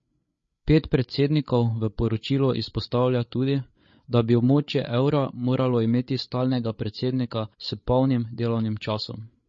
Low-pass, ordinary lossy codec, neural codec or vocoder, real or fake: 7.2 kHz; MP3, 32 kbps; codec, 16 kHz, 8 kbps, FreqCodec, larger model; fake